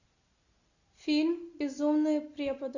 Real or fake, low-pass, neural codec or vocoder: real; 7.2 kHz; none